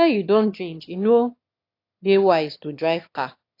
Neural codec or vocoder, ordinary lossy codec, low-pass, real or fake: autoencoder, 22.05 kHz, a latent of 192 numbers a frame, VITS, trained on one speaker; AAC, 32 kbps; 5.4 kHz; fake